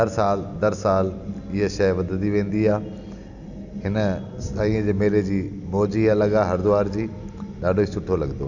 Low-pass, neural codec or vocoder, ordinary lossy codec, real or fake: 7.2 kHz; none; none; real